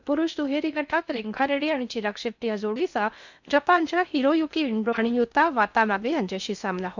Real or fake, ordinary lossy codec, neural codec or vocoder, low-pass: fake; none; codec, 16 kHz in and 24 kHz out, 0.6 kbps, FocalCodec, streaming, 2048 codes; 7.2 kHz